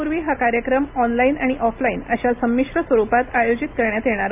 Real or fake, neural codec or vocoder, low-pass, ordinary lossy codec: real; none; 3.6 kHz; AAC, 32 kbps